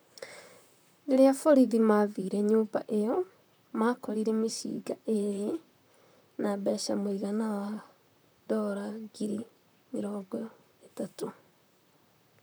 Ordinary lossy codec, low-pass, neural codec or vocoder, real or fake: none; none; vocoder, 44.1 kHz, 128 mel bands, Pupu-Vocoder; fake